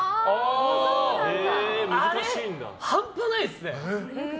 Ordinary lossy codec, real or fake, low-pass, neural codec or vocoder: none; real; none; none